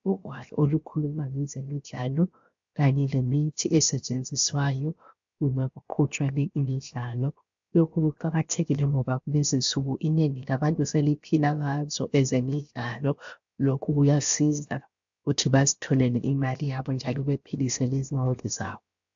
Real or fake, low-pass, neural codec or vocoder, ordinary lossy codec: fake; 7.2 kHz; codec, 16 kHz, 0.7 kbps, FocalCodec; AAC, 64 kbps